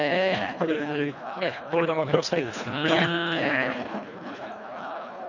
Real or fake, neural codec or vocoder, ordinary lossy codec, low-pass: fake; codec, 24 kHz, 1.5 kbps, HILCodec; none; 7.2 kHz